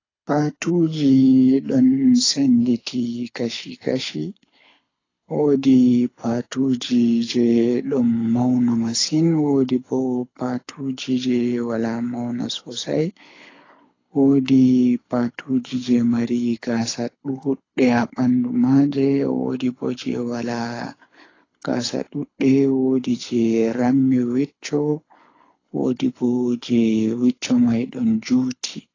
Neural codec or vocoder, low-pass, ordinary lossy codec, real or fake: codec, 24 kHz, 6 kbps, HILCodec; 7.2 kHz; AAC, 32 kbps; fake